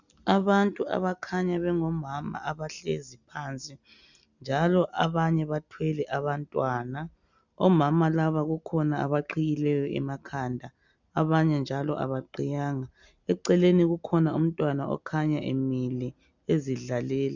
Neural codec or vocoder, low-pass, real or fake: none; 7.2 kHz; real